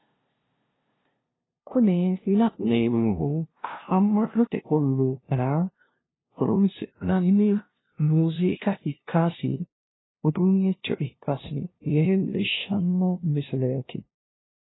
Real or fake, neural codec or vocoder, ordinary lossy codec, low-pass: fake; codec, 16 kHz, 0.5 kbps, FunCodec, trained on LibriTTS, 25 frames a second; AAC, 16 kbps; 7.2 kHz